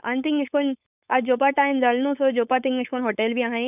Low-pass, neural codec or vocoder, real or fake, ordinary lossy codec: 3.6 kHz; codec, 16 kHz, 4.8 kbps, FACodec; fake; none